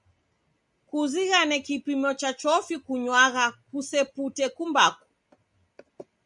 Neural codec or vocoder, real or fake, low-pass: none; real; 10.8 kHz